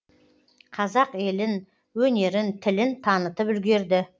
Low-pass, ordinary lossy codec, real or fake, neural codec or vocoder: none; none; real; none